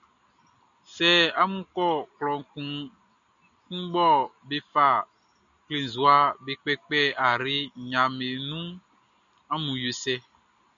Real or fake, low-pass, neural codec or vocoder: real; 7.2 kHz; none